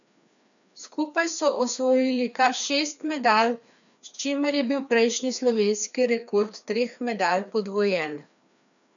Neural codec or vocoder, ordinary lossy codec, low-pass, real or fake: codec, 16 kHz, 2 kbps, FreqCodec, larger model; none; 7.2 kHz; fake